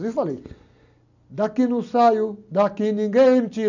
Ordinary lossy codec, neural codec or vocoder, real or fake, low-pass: none; none; real; 7.2 kHz